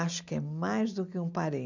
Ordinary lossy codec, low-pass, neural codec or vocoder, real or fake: none; 7.2 kHz; none; real